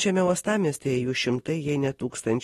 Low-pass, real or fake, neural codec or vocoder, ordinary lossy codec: 19.8 kHz; real; none; AAC, 32 kbps